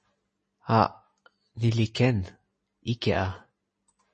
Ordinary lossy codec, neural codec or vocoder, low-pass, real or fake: MP3, 32 kbps; none; 10.8 kHz; real